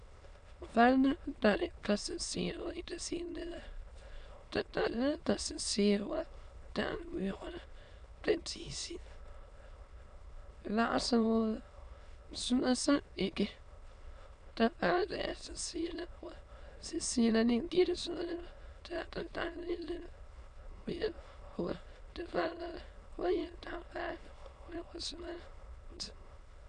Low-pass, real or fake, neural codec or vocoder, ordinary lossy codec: 9.9 kHz; fake; autoencoder, 22.05 kHz, a latent of 192 numbers a frame, VITS, trained on many speakers; none